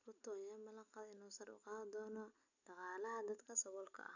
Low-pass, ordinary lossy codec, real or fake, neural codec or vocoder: 7.2 kHz; none; real; none